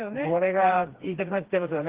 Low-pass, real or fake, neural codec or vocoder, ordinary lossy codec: 3.6 kHz; fake; codec, 16 kHz, 2 kbps, FreqCodec, smaller model; Opus, 16 kbps